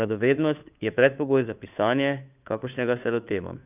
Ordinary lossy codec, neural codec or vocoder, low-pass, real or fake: none; codec, 16 kHz, 2 kbps, FunCodec, trained on Chinese and English, 25 frames a second; 3.6 kHz; fake